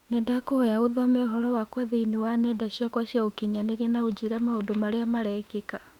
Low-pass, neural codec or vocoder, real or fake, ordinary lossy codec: 19.8 kHz; autoencoder, 48 kHz, 32 numbers a frame, DAC-VAE, trained on Japanese speech; fake; Opus, 64 kbps